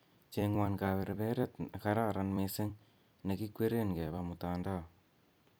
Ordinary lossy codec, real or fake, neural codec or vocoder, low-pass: none; fake; vocoder, 44.1 kHz, 128 mel bands every 256 samples, BigVGAN v2; none